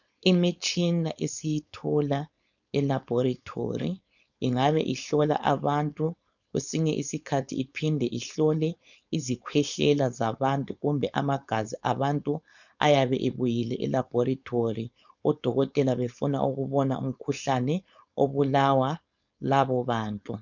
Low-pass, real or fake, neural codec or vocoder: 7.2 kHz; fake; codec, 16 kHz, 4.8 kbps, FACodec